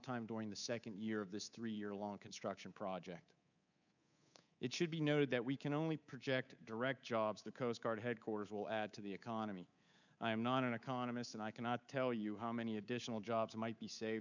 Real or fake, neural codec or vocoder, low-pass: fake; codec, 24 kHz, 3.1 kbps, DualCodec; 7.2 kHz